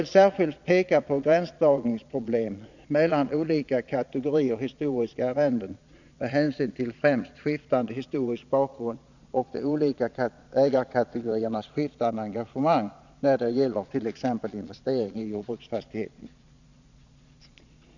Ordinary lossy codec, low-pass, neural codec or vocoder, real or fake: none; 7.2 kHz; vocoder, 22.05 kHz, 80 mel bands, Vocos; fake